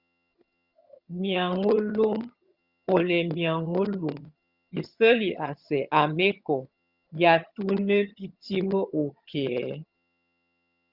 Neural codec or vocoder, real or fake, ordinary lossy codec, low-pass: vocoder, 22.05 kHz, 80 mel bands, HiFi-GAN; fake; Opus, 64 kbps; 5.4 kHz